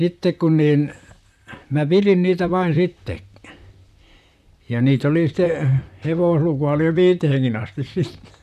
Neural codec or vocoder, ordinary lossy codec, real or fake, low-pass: vocoder, 44.1 kHz, 128 mel bands, Pupu-Vocoder; none; fake; 14.4 kHz